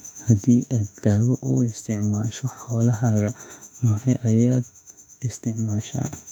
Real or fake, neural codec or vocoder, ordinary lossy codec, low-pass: fake; autoencoder, 48 kHz, 32 numbers a frame, DAC-VAE, trained on Japanese speech; none; 19.8 kHz